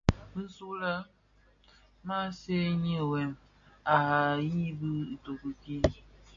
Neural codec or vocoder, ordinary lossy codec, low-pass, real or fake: none; AAC, 64 kbps; 7.2 kHz; real